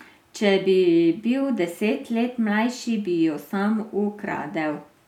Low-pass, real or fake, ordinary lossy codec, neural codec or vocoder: 19.8 kHz; real; none; none